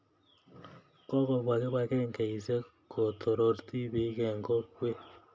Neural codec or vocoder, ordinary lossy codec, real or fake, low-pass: none; none; real; none